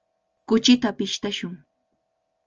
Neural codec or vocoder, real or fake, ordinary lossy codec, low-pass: none; real; Opus, 24 kbps; 7.2 kHz